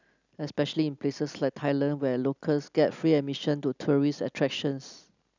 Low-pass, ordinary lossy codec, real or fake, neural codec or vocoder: 7.2 kHz; none; real; none